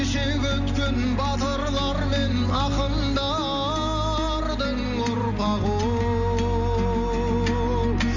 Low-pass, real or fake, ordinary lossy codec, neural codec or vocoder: 7.2 kHz; real; none; none